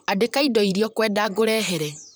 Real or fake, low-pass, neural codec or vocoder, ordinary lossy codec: fake; none; vocoder, 44.1 kHz, 128 mel bands, Pupu-Vocoder; none